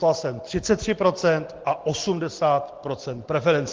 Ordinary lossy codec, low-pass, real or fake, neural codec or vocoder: Opus, 16 kbps; 7.2 kHz; real; none